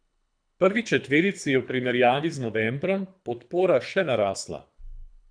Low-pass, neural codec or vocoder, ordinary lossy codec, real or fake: 9.9 kHz; codec, 24 kHz, 3 kbps, HILCodec; none; fake